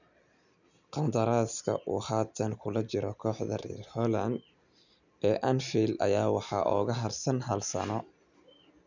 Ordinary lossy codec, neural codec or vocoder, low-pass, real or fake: none; none; 7.2 kHz; real